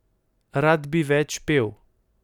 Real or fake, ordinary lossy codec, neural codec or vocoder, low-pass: real; none; none; 19.8 kHz